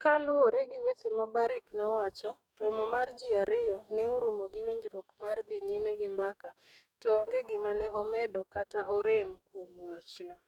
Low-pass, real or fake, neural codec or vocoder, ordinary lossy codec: 19.8 kHz; fake; codec, 44.1 kHz, 2.6 kbps, DAC; none